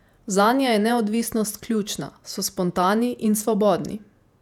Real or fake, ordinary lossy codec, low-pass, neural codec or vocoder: fake; none; 19.8 kHz; vocoder, 48 kHz, 128 mel bands, Vocos